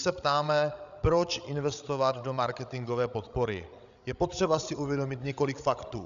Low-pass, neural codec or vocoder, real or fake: 7.2 kHz; codec, 16 kHz, 16 kbps, FreqCodec, larger model; fake